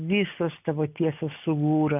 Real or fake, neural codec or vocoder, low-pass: real; none; 3.6 kHz